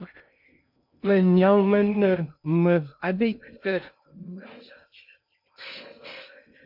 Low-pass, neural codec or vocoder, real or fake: 5.4 kHz; codec, 16 kHz in and 24 kHz out, 0.6 kbps, FocalCodec, streaming, 2048 codes; fake